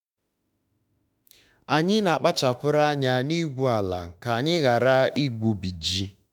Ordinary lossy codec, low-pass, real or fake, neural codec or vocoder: none; 19.8 kHz; fake; autoencoder, 48 kHz, 32 numbers a frame, DAC-VAE, trained on Japanese speech